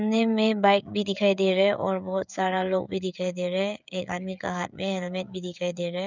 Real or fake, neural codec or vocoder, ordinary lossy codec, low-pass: fake; codec, 16 kHz, 16 kbps, FreqCodec, smaller model; none; 7.2 kHz